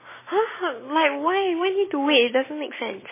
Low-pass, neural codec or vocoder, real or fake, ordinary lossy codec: 3.6 kHz; none; real; MP3, 16 kbps